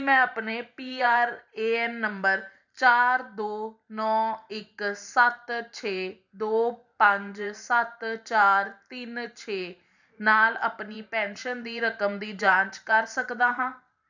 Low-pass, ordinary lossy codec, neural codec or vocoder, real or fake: 7.2 kHz; none; vocoder, 44.1 kHz, 128 mel bands every 512 samples, BigVGAN v2; fake